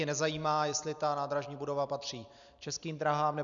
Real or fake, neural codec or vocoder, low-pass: real; none; 7.2 kHz